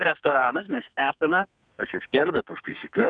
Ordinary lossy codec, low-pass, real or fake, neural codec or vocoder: MP3, 96 kbps; 9.9 kHz; fake; codec, 32 kHz, 1.9 kbps, SNAC